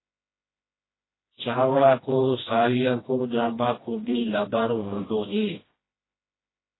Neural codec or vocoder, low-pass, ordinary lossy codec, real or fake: codec, 16 kHz, 1 kbps, FreqCodec, smaller model; 7.2 kHz; AAC, 16 kbps; fake